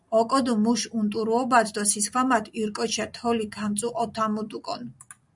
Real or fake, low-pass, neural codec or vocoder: real; 10.8 kHz; none